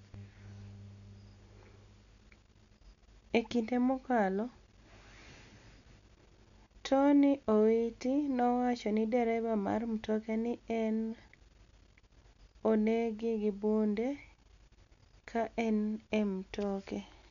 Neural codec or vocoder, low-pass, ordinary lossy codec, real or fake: none; 7.2 kHz; none; real